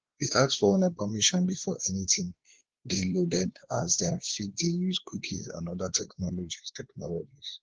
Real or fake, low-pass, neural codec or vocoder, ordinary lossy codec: fake; 7.2 kHz; codec, 16 kHz, 2 kbps, X-Codec, WavLM features, trained on Multilingual LibriSpeech; Opus, 16 kbps